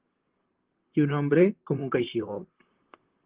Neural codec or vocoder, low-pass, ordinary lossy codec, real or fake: vocoder, 44.1 kHz, 128 mel bands, Pupu-Vocoder; 3.6 kHz; Opus, 16 kbps; fake